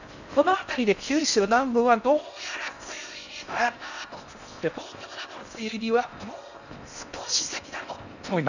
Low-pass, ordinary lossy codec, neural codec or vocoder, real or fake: 7.2 kHz; none; codec, 16 kHz in and 24 kHz out, 0.6 kbps, FocalCodec, streaming, 4096 codes; fake